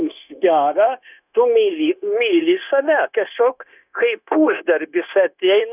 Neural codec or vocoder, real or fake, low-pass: codec, 16 kHz, 0.9 kbps, LongCat-Audio-Codec; fake; 3.6 kHz